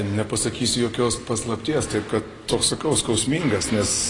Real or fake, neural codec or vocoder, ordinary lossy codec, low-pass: real; none; AAC, 32 kbps; 10.8 kHz